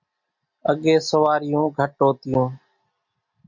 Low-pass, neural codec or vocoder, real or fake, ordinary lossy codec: 7.2 kHz; none; real; MP3, 48 kbps